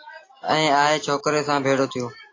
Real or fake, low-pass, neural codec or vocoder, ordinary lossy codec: real; 7.2 kHz; none; AAC, 32 kbps